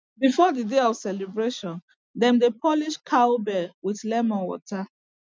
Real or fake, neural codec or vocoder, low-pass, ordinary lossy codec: real; none; none; none